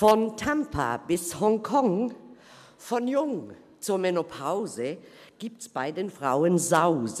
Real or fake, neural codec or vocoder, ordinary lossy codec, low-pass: real; none; none; 14.4 kHz